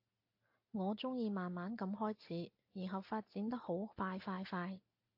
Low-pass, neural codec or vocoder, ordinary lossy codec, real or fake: 5.4 kHz; none; AAC, 48 kbps; real